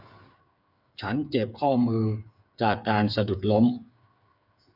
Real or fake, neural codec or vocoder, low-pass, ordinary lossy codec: fake; codec, 16 kHz, 8 kbps, FreqCodec, smaller model; 5.4 kHz; none